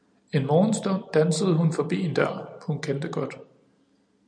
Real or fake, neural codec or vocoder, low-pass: real; none; 9.9 kHz